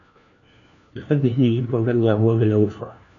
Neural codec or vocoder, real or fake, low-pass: codec, 16 kHz, 1 kbps, FunCodec, trained on LibriTTS, 50 frames a second; fake; 7.2 kHz